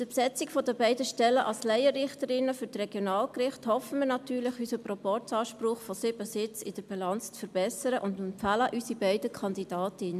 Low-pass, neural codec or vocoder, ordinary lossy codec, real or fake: 14.4 kHz; none; MP3, 96 kbps; real